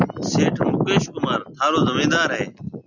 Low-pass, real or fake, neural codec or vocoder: 7.2 kHz; real; none